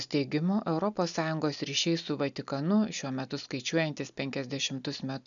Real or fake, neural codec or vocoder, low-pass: real; none; 7.2 kHz